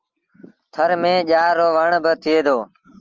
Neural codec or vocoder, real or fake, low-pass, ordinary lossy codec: none; real; 7.2 kHz; Opus, 24 kbps